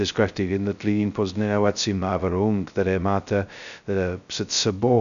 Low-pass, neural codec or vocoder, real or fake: 7.2 kHz; codec, 16 kHz, 0.2 kbps, FocalCodec; fake